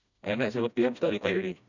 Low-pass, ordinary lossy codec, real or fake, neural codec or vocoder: 7.2 kHz; none; fake; codec, 16 kHz, 1 kbps, FreqCodec, smaller model